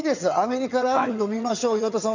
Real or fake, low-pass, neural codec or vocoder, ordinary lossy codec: fake; 7.2 kHz; vocoder, 22.05 kHz, 80 mel bands, HiFi-GAN; none